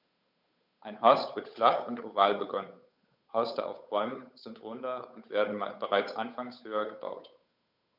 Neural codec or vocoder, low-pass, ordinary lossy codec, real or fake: codec, 16 kHz, 8 kbps, FunCodec, trained on Chinese and English, 25 frames a second; 5.4 kHz; none; fake